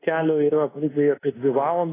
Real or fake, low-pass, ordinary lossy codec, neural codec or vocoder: fake; 3.6 kHz; AAC, 16 kbps; codec, 24 kHz, 1.2 kbps, DualCodec